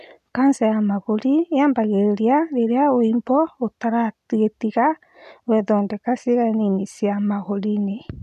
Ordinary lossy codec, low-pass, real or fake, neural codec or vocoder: none; 14.4 kHz; real; none